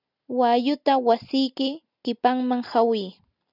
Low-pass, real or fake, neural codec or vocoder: 5.4 kHz; real; none